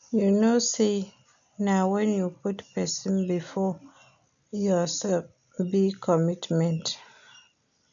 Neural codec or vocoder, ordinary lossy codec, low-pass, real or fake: none; none; 7.2 kHz; real